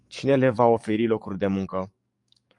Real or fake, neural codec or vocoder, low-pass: fake; codec, 44.1 kHz, 7.8 kbps, DAC; 10.8 kHz